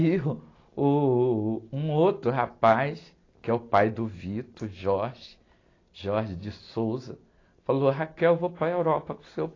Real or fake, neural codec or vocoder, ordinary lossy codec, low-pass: real; none; AAC, 32 kbps; 7.2 kHz